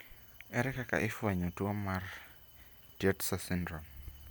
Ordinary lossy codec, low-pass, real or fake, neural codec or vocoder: none; none; real; none